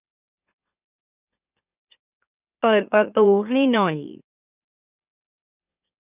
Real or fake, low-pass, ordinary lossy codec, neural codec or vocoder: fake; 3.6 kHz; none; autoencoder, 44.1 kHz, a latent of 192 numbers a frame, MeloTTS